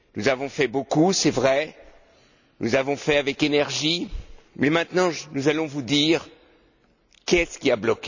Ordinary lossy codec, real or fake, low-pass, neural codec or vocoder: none; real; 7.2 kHz; none